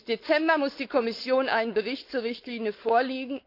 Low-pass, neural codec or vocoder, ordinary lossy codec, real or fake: 5.4 kHz; codec, 16 kHz, 4.8 kbps, FACodec; AAC, 32 kbps; fake